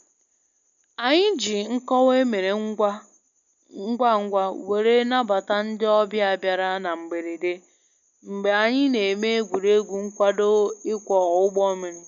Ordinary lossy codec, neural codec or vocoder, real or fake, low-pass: none; none; real; 7.2 kHz